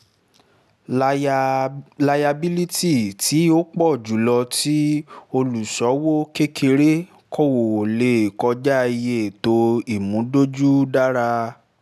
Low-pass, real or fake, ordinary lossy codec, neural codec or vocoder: 14.4 kHz; real; none; none